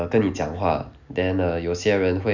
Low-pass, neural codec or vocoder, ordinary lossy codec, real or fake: 7.2 kHz; none; none; real